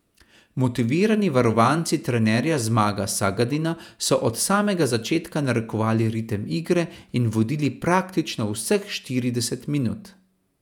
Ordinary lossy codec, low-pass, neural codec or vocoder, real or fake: none; 19.8 kHz; vocoder, 48 kHz, 128 mel bands, Vocos; fake